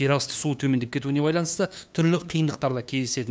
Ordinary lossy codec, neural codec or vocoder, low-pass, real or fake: none; codec, 16 kHz, 2 kbps, FunCodec, trained on LibriTTS, 25 frames a second; none; fake